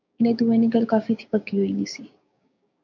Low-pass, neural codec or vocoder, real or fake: 7.2 kHz; codec, 16 kHz, 6 kbps, DAC; fake